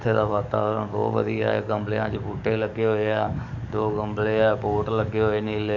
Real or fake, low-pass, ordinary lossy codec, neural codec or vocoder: fake; 7.2 kHz; none; codec, 44.1 kHz, 7.8 kbps, DAC